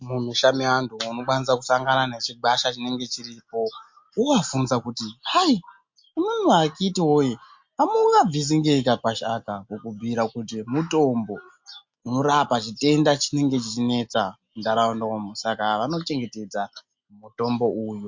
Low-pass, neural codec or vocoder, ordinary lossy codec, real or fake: 7.2 kHz; none; MP3, 48 kbps; real